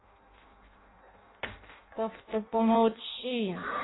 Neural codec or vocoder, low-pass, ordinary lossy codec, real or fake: codec, 16 kHz in and 24 kHz out, 0.6 kbps, FireRedTTS-2 codec; 7.2 kHz; AAC, 16 kbps; fake